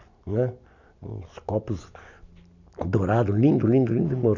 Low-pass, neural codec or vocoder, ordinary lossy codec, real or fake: 7.2 kHz; none; none; real